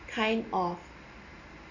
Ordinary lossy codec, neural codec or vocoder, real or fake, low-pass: none; none; real; 7.2 kHz